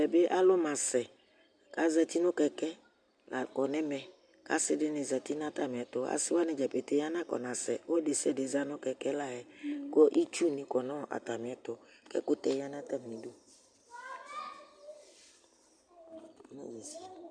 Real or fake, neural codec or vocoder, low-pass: real; none; 9.9 kHz